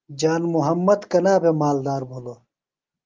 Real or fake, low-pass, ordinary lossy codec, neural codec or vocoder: real; 7.2 kHz; Opus, 32 kbps; none